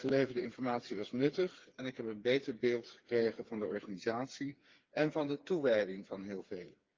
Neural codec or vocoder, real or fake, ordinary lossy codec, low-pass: codec, 16 kHz, 4 kbps, FreqCodec, smaller model; fake; Opus, 32 kbps; 7.2 kHz